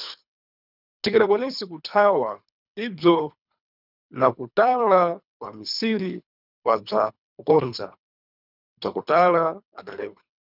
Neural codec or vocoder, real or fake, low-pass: codec, 24 kHz, 3 kbps, HILCodec; fake; 5.4 kHz